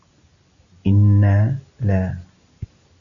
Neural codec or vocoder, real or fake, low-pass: none; real; 7.2 kHz